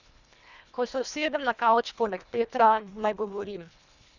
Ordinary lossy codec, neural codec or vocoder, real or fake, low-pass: none; codec, 24 kHz, 1.5 kbps, HILCodec; fake; 7.2 kHz